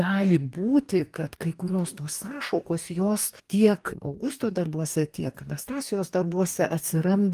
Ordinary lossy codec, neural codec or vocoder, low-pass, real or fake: Opus, 32 kbps; codec, 44.1 kHz, 2.6 kbps, DAC; 14.4 kHz; fake